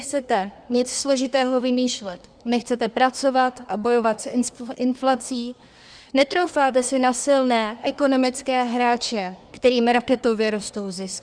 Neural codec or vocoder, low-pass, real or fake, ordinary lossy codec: codec, 24 kHz, 1 kbps, SNAC; 9.9 kHz; fake; Opus, 64 kbps